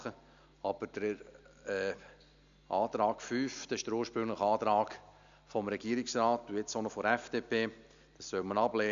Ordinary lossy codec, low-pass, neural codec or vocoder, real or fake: AAC, 96 kbps; 7.2 kHz; none; real